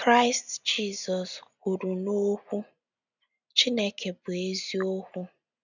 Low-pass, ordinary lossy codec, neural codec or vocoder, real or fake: 7.2 kHz; none; none; real